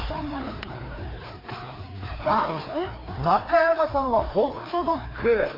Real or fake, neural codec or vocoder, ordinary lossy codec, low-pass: fake; codec, 16 kHz, 2 kbps, FreqCodec, larger model; AAC, 24 kbps; 5.4 kHz